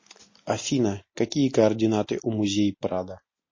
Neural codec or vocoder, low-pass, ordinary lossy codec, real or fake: none; 7.2 kHz; MP3, 32 kbps; real